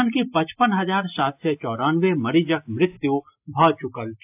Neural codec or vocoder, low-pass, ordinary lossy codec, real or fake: none; 3.6 kHz; AAC, 32 kbps; real